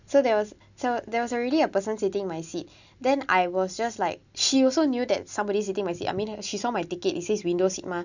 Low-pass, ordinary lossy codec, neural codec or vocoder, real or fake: 7.2 kHz; none; none; real